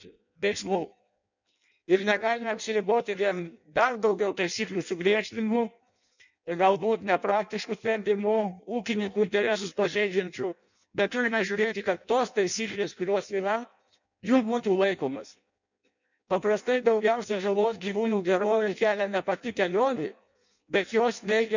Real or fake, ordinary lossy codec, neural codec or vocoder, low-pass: fake; none; codec, 16 kHz in and 24 kHz out, 0.6 kbps, FireRedTTS-2 codec; 7.2 kHz